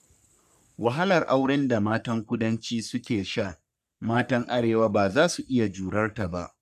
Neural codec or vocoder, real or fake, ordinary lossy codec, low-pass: codec, 44.1 kHz, 3.4 kbps, Pupu-Codec; fake; none; 14.4 kHz